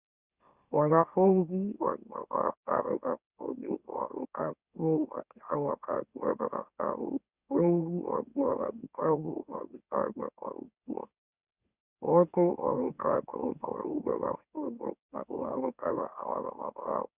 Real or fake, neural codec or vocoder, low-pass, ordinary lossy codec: fake; autoencoder, 44.1 kHz, a latent of 192 numbers a frame, MeloTTS; 3.6 kHz; Opus, 64 kbps